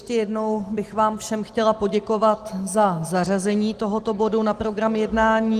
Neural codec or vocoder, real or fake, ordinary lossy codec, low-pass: none; real; Opus, 24 kbps; 14.4 kHz